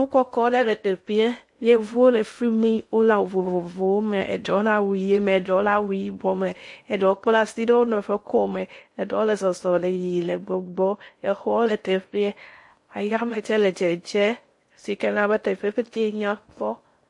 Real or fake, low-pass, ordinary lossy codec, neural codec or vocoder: fake; 10.8 kHz; MP3, 48 kbps; codec, 16 kHz in and 24 kHz out, 0.6 kbps, FocalCodec, streaming, 2048 codes